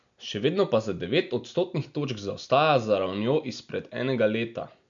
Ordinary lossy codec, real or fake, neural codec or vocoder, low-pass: MP3, 64 kbps; real; none; 7.2 kHz